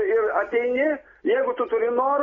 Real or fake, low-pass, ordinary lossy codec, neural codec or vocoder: real; 7.2 kHz; AAC, 24 kbps; none